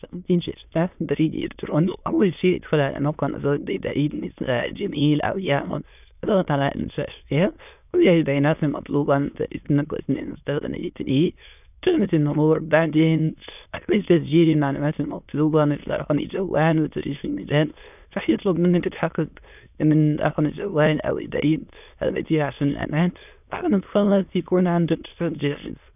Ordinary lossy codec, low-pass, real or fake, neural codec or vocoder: none; 3.6 kHz; fake; autoencoder, 22.05 kHz, a latent of 192 numbers a frame, VITS, trained on many speakers